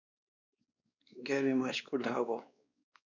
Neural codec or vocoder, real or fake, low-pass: codec, 16 kHz, 2 kbps, X-Codec, WavLM features, trained on Multilingual LibriSpeech; fake; 7.2 kHz